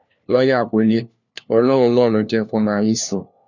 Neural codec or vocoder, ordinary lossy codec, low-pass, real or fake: codec, 16 kHz, 1 kbps, FunCodec, trained on LibriTTS, 50 frames a second; none; 7.2 kHz; fake